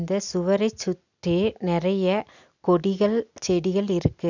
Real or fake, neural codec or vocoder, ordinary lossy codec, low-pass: real; none; none; 7.2 kHz